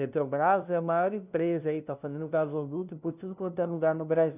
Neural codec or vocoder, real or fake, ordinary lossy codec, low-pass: codec, 16 kHz, 0.5 kbps, FunCodec, trained on LibriTTS, 25 frames a second; fake; none; 3.6 kHz